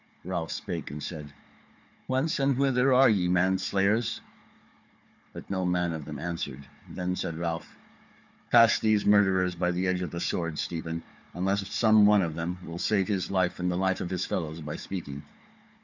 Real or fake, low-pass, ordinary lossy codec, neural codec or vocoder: fake; 7.2 kHz; MP3, 64 kbps; codec, 16 kHz, 4 kbps, FunCodec, trained on Chinese and English, 50 frames a second